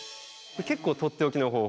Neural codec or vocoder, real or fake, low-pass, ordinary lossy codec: none; real; none; none